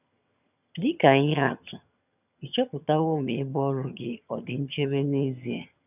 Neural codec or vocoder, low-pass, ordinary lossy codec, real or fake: vocoder, 22.05 kHz, 80 mel bands, HiFi-GAN; 3.6 kHz; none; fake